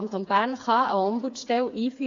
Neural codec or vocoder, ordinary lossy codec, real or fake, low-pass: codec, 16 kHz, 4 kbps, FreqCodec, smaller model; AAC, 48 kbps; fake; 7.2 kHz